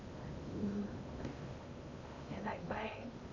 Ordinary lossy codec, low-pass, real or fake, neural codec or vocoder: MP3, 48 kbps; 7.2 kHz; fake; codec, 16 kHz in and 24 kHz out, 0.8 kbps, FocalCodec, streaming, 65536 codes